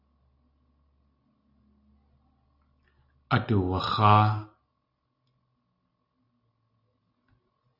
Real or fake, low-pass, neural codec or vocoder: real; 5.4 kHz; none